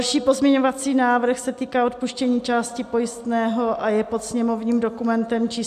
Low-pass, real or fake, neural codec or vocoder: 14.4 kHz; real; none